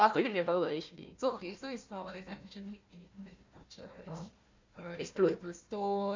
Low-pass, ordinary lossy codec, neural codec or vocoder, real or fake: 7.2 kHz; MP3, 64 kbps; codec, 16 kHz, 1 kbps, FunCodec, trained on Chinese and English, 50 frames a second; fake